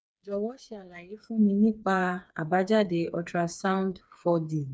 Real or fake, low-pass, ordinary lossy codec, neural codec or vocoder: fake; none; none; codec, 16 kHz, 4 kbps, FreqCodec, smaller model